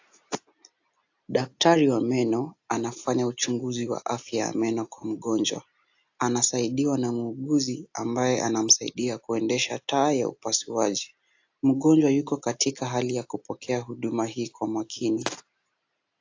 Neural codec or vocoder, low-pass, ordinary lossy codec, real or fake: none; 7.2 kHz; AAC, 48 kbps; real